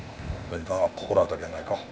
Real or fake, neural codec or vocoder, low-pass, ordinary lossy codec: fake; codec, 16 kHz, 0.8 kbps, ZipCodec; none; none